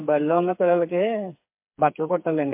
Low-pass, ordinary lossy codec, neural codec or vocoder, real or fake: 3.6 kHz; MP3, 32 kbps; codec, 16 kHz, 4 kbps, FreqCodec, smaller model; fake